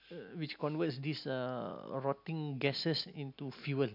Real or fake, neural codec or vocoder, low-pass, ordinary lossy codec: real; none; 5.4 kHz; MP3, 48 kbps